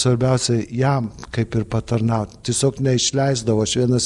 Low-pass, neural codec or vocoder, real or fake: 10.8 kHz; none; real